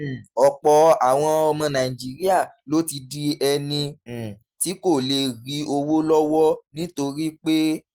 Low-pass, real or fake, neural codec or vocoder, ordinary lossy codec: 19.8 kHz; real; none; Opus, 24 kbps